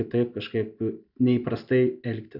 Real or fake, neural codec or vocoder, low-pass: real; none; 5.4 kHz